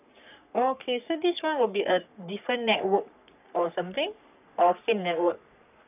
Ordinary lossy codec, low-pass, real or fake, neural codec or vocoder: none; 3.6 kHz; fake; codec, 44.1 kHz, 3.4 kbps, Pupu-Codec